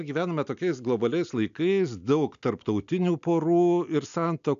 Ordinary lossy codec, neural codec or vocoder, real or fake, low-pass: AAC, 96 kbps; none; real; 7.2 kHz